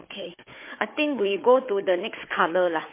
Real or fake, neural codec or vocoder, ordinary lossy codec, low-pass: fake; codec, 16 kHz, 4 kbps, FunCodec, trained on Chinese and English, 50 frames a second; MP3, 24 kbps; 3.6 kHz